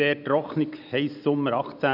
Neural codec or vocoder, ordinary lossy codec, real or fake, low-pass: none; none; real; 5.4 kHz